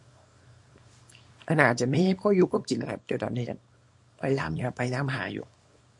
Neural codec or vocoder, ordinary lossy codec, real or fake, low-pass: codec, 24 kHz, 0.9 kbps, WavTokenizer, small release; MP3, 48 kbps; fake; 10.8 kHz